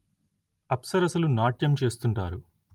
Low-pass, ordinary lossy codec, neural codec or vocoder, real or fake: 19.8 kHz; Opus, 32 kbps; none; real